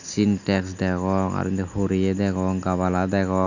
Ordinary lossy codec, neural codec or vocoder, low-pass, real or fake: none; none; 7.2 kHz; real